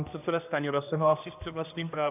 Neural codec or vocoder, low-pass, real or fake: codec, 16 kHz, 1 kbps, X-Codec, HuBERT features, trained on general audio; 3.6 kHz; fake